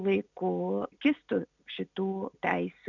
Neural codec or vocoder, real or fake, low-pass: none; real; 7.2 kHz